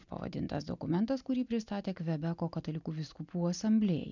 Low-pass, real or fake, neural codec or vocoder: 7.2 kHz; real; none